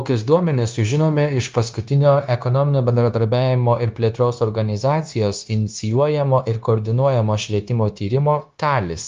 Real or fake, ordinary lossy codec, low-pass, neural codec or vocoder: fake; Opus, 32 kbps; 7.2 kHz; codec, 16 kHz, 0.9 kbps, LongCat-Audio-Codec